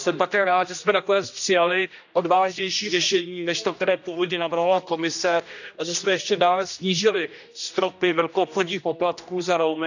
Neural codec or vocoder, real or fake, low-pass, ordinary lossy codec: codec, 16 kHz, 1 kbps, X-Codec, HuBERT features, trained on general audio; fake; 7.2 kHz; none